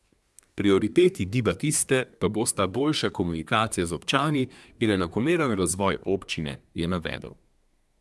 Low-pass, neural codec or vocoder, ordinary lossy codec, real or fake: none; codec, 24 kHz, 1 kbps, SNAC; none; fake